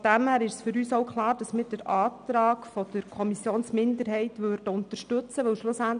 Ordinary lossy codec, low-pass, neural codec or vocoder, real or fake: none; 9.9 kHz; none; real